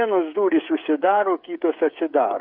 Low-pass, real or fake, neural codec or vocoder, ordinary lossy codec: 5.4 kHz; fake; codec, 16 kHz, 8 kbps, FreqCodec, smaller model; MP3, 48 kbps